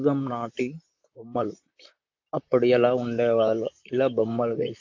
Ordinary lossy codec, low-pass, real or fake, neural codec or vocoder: AAC, 48 kbps; 7.2 kHz; fake; vocoder, 44.1 kHz, 128 mel bands, Pupu-Vocoder